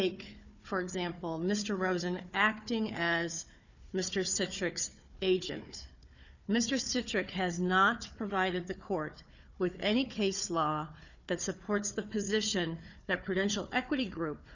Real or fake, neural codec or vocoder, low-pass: fake; codec, 16 kHz, 4 kbps, FunCodec, trained on Chinese and English, 50 frames a second; 7.2 kHz